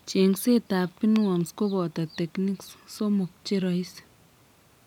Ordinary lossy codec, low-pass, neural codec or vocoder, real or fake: none; 19.8 kHz; none; real